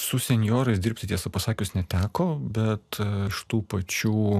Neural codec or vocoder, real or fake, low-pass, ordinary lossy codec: none; real; 14.4 kHz; AAC, 96 kbps